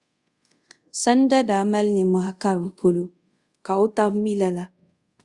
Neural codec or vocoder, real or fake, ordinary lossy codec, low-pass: codec, 24 kHz, 0.5 kbps, DualCodec; fake; Opus, 64 kbps; 10.8 kHz